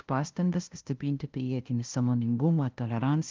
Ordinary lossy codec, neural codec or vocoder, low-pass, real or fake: Opus, 32 kbps; codec, 16 kHz, 0.5 kbps, FunCodec, trained on LibriTTS, 25 frames a second; 7.2 kHz; fake